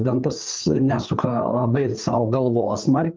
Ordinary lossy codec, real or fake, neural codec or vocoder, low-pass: Opus, 24 kbps; fake; codec, 16 kHz, 4 kbps, FreqCodec, larger model; 7.2 kHz